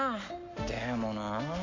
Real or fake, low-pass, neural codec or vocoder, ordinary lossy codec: real; 7.2 kHz; none; MP3, 64 kbps